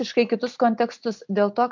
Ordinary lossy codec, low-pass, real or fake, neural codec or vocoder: MP3, 64 kbps; 7.2 kHz; real; none